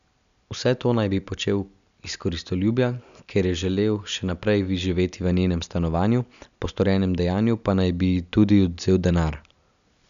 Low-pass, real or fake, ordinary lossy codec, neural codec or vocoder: 7.2 kHz; real; none; none